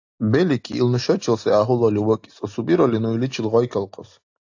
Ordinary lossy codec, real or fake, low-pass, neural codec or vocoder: AAC, 48 kbps; real; 7.2 kHz; none